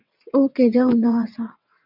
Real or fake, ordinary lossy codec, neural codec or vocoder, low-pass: fake; MP3, 48 kbps; vocoder, 22.05 kHz, 80 mel bands, WaveNeXt; 5.4 kHz